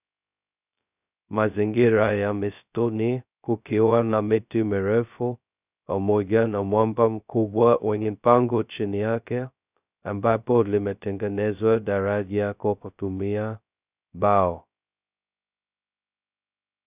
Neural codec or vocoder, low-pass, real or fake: codec, 16 kHz, 0.2 kbps, FocalCodec; 3.6 kHz; fake